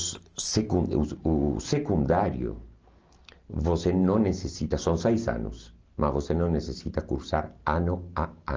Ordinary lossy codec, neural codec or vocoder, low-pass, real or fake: Opus, 24 kbps; none; 7.2 kHz; real